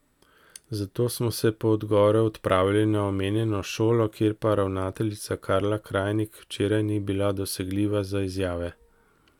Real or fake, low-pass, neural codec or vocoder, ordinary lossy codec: real; 19.8 kHz; none; none